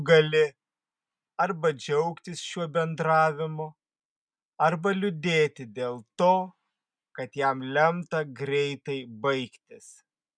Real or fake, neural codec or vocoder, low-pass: real; none; 9.9 kHz